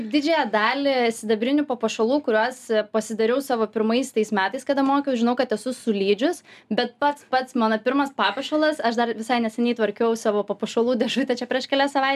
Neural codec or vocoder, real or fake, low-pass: none; real; 14.4 kHz